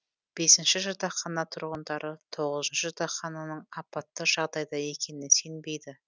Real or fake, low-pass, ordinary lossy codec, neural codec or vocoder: real; none; none; none